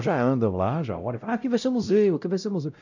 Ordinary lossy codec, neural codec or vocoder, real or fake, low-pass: none; codec, 16 kHz, 0.5 kbps, X-Codec, WavLM features, trained on Multilingual LibriSpeech; fake; 7.2 kHz